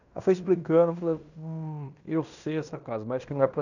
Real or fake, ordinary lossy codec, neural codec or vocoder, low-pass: fake; none; codec, 16 kHz in and 24 kHz out, 0.9 kbps, LongCat-Audio-Codec, fine tuned four codebook decoder; 7.2 kHz